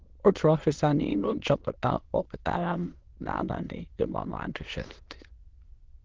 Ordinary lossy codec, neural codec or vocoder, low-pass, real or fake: Opus, 16 kbps; autoencoder, 22.05 kHz, a latent of 192 numbers a frame, VITS, trained on many speakers; 7.2 kHz; fake